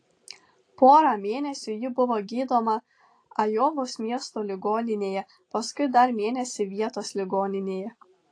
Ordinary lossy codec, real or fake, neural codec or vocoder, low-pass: AAC, 48 kbps; real; none; 9.9 kHz